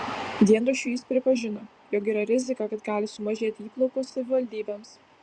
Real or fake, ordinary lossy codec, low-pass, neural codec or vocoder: fake; Opus, 64 kbps; 9.9 kHz; vocoder, 24 kHz, 100 mel bands, Vocos